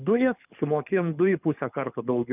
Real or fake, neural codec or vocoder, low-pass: fake; codec, 24 kHz, 6 kbps, HILCodec; 3.6 kHz